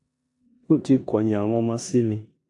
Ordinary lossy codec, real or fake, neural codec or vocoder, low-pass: AAC, 64 kbps; fake; codec, 16 kHz in and 24 kHz out, 0.9 kbps, LongCat-Audio-Codec, four codebook decoder; 10.8 kHz